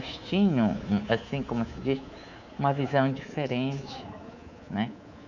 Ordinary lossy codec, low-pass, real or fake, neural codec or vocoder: none; 7.2 kHz; fake; codec, 24 kHz, 3.1 kbps, DualCodec